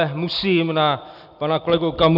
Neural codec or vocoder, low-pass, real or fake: none; 5.4 kHz; real